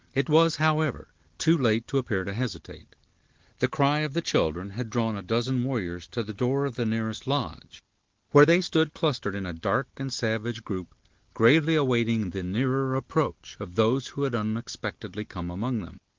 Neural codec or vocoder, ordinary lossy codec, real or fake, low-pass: none; Opus, 16 kbps; real; 7.2 kHz